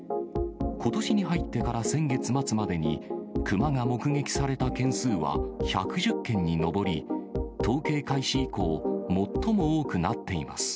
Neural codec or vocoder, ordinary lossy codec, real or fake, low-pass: none; none; real; none